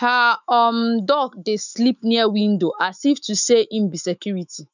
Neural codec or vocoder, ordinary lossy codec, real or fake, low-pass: autoencoder, 48 kHz, 128 numbers a frame, DAC-VAE, trained on Japanese speech; none; fake; 7.2 kHz